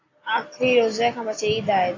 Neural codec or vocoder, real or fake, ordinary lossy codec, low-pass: none; real; AAC, 32 kbps; 7.2 kHz